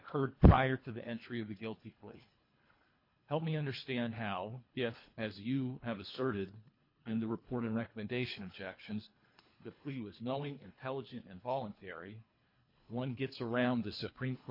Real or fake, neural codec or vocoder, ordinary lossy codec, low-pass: fake; codec, 24 kHz, 3 kbps, HILCodec; MP3, 32 kbps; 5.4 kHz